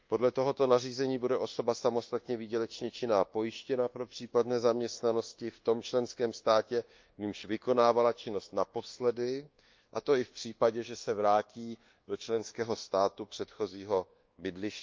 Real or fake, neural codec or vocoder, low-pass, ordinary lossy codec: fake; codec, 24 kHz, 1.2 kbps, DualCodec; 7.2 kHz; Opus, 32 kbps